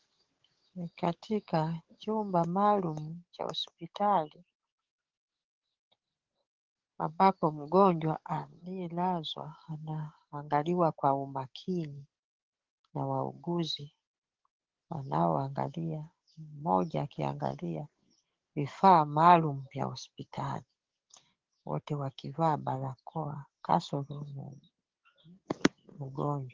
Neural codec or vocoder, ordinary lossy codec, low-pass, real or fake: codec, 44.1 kHz, 7.8 kbps, DAC; Opus, 16 kbps; 7.2 kHz; fake